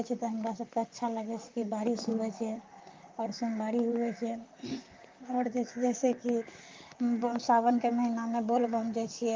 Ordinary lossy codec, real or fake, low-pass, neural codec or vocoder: Opus, 24 kbps; fake; 7.2 kHz; vocoder, 44.1 kHz, 128 mel bands, Pupu-Vocoder